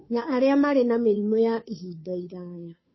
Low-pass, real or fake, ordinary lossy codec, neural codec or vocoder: 7.2 kHz; fake; MP3, 24 kbps; codec, 16 kHz, 2 kbps, FunCodec, trained on Chinese and English, 25 frames a second